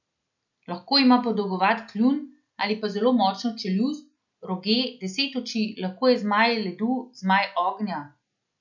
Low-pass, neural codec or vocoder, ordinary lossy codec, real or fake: 7.2 kHz; none; none; real